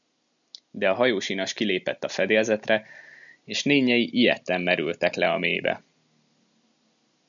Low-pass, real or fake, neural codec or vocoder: 7.2 kHz; real; none